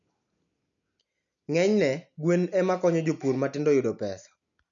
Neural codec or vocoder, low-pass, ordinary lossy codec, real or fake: none; 7.2 kHz; none; real